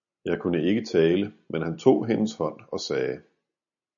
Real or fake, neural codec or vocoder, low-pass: real; none; 7.2 kHz